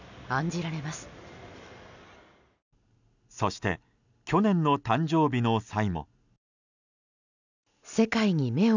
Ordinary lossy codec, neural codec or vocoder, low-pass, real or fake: none; none; 7.2 kHz; real